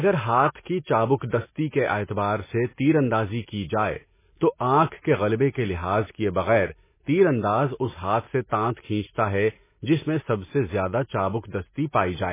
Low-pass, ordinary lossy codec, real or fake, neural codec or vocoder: 3.6 kHz; MP3, 16 kbps; real; none